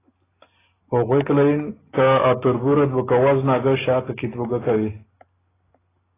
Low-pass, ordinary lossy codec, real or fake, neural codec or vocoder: 3.6 kHz; AAC, 16 kbps; real; none